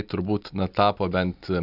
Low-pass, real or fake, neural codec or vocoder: 5.4 kHz; real; none